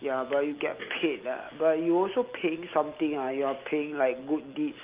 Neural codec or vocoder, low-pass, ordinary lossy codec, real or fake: none; 3.6 kHz; Opus, 32 kbps; real